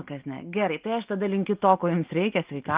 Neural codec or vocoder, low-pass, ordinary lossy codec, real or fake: none; 3.6 kHz; Opus, 32 kbps; real